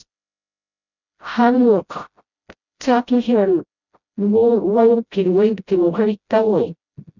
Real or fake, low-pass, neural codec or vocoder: fake; 7.2 kHz; codec, 16 kHz, 0.5 kbps, FreqCodec, smaller model